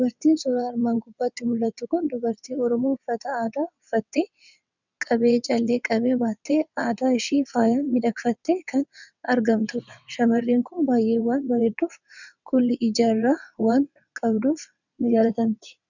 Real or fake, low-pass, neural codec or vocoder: fake; 7.2 kHz; vocoder, 22.05 kHz, 80 mel bands, WaveNeXt